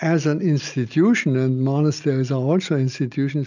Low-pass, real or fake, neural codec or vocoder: 7.2 kHz; real; none